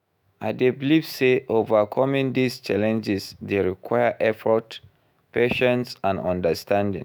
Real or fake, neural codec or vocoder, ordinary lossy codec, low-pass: fake; autoencoder, 48 kHz, 128 numbers a frame, DAC-VAE, trained on Japanese speech; none; none